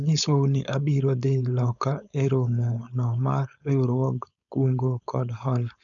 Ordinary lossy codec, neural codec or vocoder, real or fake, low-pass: none; codec, 16 kHz, 4.8 kbps, FACodec; fake; 7.2 kHz